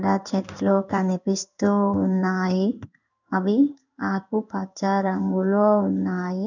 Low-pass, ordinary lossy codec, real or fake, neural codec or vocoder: 7.2 kHz; none; fake; codec, 16 kHz in and 24 kHz out, 1 kbps, XY-Tokenizer